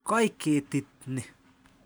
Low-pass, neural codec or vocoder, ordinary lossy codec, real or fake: none; none; none; real